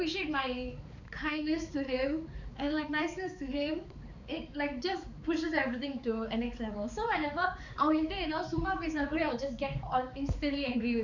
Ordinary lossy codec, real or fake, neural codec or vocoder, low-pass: none; fake; codec, 16 kHz, 4 kbps, X-Codec, HuBERT features, trained on balanced general audio; 7.2 kHz